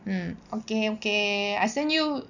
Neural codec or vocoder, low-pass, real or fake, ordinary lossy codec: none; 7.2 kHz; real; none